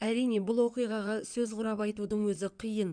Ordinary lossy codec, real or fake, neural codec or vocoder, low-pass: none; fake; codec, 16 kHz in and 24 kHz out, 2.2 kbps, FireRedTTS-2 codec; 9.9 kHz